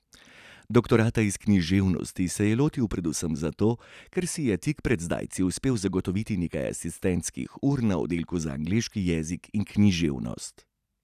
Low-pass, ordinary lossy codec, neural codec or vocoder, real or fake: 14.4 kHz; none; none; real